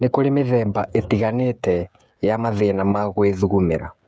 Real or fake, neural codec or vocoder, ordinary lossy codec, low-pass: fake; codec, 16 kHz, 16 kbps, FreqCodec, smaller model; none; none